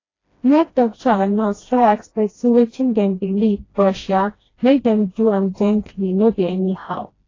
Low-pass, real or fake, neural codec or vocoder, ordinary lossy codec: 7.2 kHz; fake; codec, 16 kHz, 1 kbps, FreqCodec, smaller model; AAC, 32 kbps